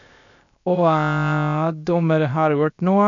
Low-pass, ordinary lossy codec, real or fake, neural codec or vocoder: 7.2 kHz; none; fake; codec, 16 kHz, 0.7 kbps, FocalCodec